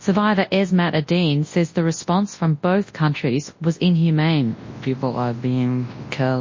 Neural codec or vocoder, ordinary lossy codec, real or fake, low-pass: codec, 24 kHz, 0.9 kbps, WavTokenizer, large speech release; MP3, 32 kbps; fake; 7.2 kHz